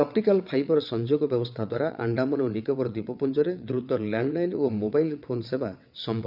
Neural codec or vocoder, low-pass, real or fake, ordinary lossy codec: vocoder, 22.05 kHz, 80 mel bands, WaveNeXt; 5.4 kHz; fake; none